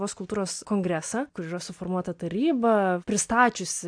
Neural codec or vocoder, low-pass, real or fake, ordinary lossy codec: none; 9.9 kHz; real; AAC, 64 kbps